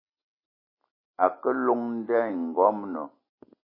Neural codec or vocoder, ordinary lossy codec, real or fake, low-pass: none; MP3, 24 kbps; real; 5.4 kHz